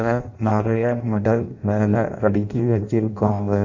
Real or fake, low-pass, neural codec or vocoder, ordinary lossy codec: fake; 7.2 kHz; codec, 16 kHz in and 24 kHz out, 0.6 kbps, FireRedTTS-2 codec; none